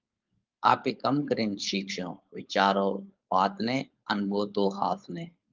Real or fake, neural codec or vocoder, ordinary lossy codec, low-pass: fake; codec, 16 kHz, 4.8 kbps, FACodec; Opus, 24 kbps; 7.2 kHz